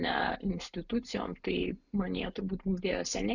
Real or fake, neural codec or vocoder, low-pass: real; none; 7.2 kHz